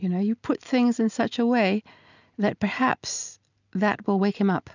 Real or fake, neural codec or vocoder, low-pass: real; none; 7.2 kHz